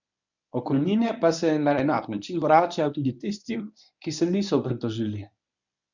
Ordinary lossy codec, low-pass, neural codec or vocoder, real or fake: none; 7.2 kHz; codec, 24 kHz, 0.9 kbps, WavTokenizer, medium speech release version 1; fake